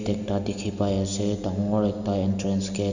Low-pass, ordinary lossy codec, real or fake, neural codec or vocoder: 7.2 kHz; none; real; none